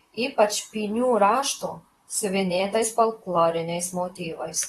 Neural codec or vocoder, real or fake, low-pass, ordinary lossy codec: vocoder, 44.1 kHz, 128 mel bands, Pupu-Vocoder; fake; 19.8 kHz; AAC, 32 kbps